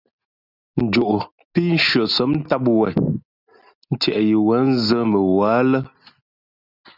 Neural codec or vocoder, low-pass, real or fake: none; 5.4 kHz; real